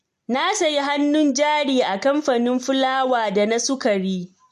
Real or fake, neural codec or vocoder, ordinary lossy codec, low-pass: real; none; MP3, 64 kbps; 14.4 kHz